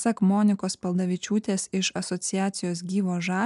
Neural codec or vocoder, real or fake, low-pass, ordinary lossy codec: none; real; 10.8 kHz; MP3, 96 kbps